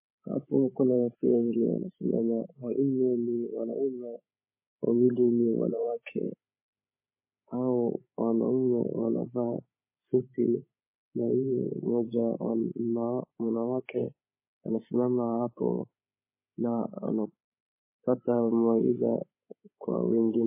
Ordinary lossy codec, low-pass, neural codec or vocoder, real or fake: MP3, 16 kbps; 3.6 kHz; codec, 16 kHz, 16 kbps, FreqCodec, larger model; fake